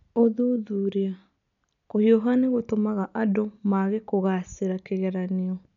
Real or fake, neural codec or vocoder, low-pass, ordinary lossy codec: real; none; 7.2 kHz; none